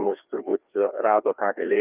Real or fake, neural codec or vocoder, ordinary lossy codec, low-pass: fake; codec, 16 kHz, 2 kbps, FreqCodec, larger model; Opus, 24 kbps; 3.6 kHz